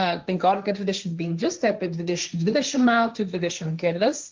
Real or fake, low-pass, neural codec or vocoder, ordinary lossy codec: fake; 7.2 kHz; codec, 16 kHz, 1.1 kbps, Voila-Tokenizer; Opus, 32 kbps